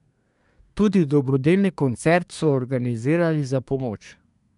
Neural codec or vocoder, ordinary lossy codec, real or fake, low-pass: codec, 24 kHz, 1 kbps, SNAC; none; fake; 10.8 kHz